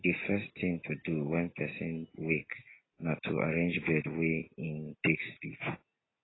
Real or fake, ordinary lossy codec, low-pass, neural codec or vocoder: real; AAC, 16 kbps; 7.2 kHz; none